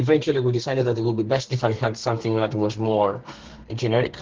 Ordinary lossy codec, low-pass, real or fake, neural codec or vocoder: Opus, 16 kbps; 7.2 kHz; fake; codec, 32 kHz, 1.9 kbps, SNAC